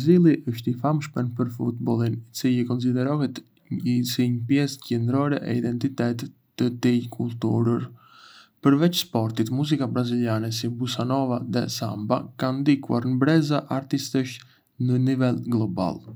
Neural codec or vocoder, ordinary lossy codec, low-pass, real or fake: none; none; none; real